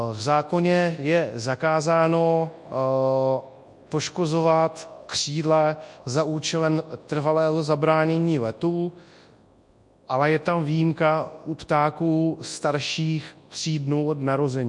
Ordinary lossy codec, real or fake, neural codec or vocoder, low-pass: MP3, 48 kbps; fake; codec, 24 kHz, 0.9 kbps, WavTokenizer, large speech release; 10.8 kHz